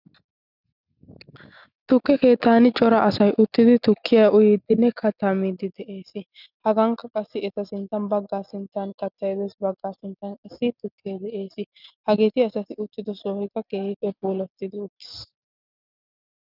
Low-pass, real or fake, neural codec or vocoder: 5.4 kHz; real; none